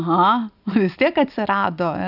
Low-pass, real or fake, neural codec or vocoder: 5.4 kHz; real; none